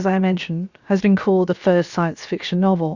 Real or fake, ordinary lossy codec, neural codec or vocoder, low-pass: fake; Opus, 64 kbps; codec, 16 kHz, 0.7 kbps, FocalCodec; 7.2 kHz